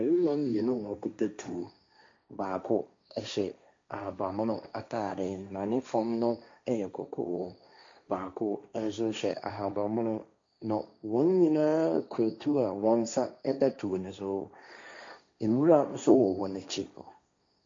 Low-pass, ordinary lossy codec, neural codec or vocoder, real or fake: 7.2 kHz; MP3, 48 kbps; codec, 16 kHz, 1.1 kbps, Voila-Tokenizer; fake